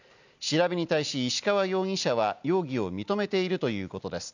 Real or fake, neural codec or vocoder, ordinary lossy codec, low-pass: real; none; none; 7.2 kHz